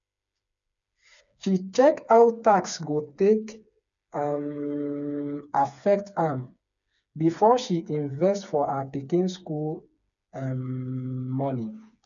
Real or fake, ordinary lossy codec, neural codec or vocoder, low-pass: fake; none; codec, 16 kHz, 4 kbps, FreqCodec, smaller model; 7.2 kHz